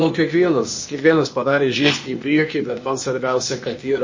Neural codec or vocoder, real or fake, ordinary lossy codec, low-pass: codec, 16 kHz, 0.8 kbps, ZipCodec; fake; MP3, 32 kbps; 7.2 kHz